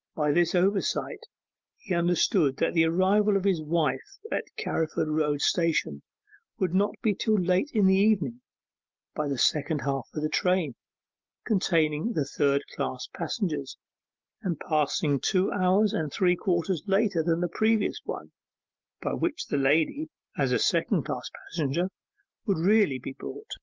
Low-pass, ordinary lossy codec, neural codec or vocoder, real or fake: 7.2 kHz; Opus, 24 kbps; none; real